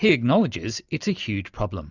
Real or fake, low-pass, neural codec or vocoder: real; 7.2 kHz; none